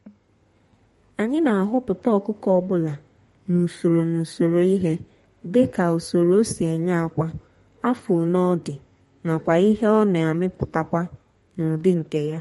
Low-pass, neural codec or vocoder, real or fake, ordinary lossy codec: 14.4 kHz; codec, 32 kHz, 1.9 kbps, SNAC; fake; MP3, 48 kbps